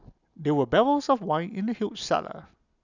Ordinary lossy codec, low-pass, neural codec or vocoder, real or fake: none; 7.2 kHz; none; real